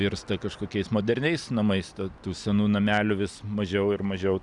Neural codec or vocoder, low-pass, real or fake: none; 10.8 kHz; real